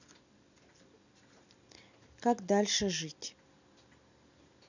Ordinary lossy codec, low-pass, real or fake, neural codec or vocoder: none; 7.2 kHz; real; none